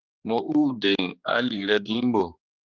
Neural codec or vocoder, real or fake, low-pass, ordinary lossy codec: codec, 16 kHz, 2 kbps, X-Codec, HuBERT features, trained on general audio; fake; 7.2 kHz; Opus, 24 kbps